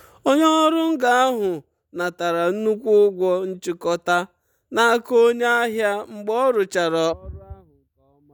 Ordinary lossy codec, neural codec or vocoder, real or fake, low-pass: none; none; real; none